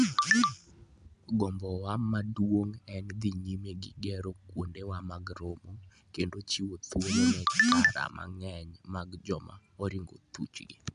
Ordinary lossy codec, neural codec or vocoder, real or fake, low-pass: none; none; real; 9.9 kHz